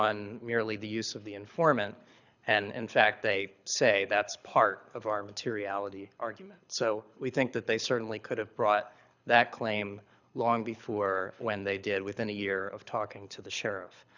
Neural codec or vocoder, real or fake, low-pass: codec, 24 kHz, 6 kbps, HILCodec; fake; 7.2 kHz